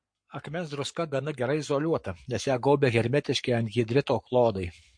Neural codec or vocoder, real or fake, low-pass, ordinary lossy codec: codec, 44.1 kHz, 7.8 kbps, Pupu-Codec; fake; 9.9 kHz; MP3, 48 kbps